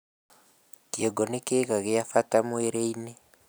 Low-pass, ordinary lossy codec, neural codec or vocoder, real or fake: none; none; none; real